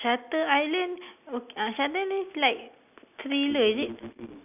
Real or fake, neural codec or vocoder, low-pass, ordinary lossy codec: real; none; 3.6 kHz; none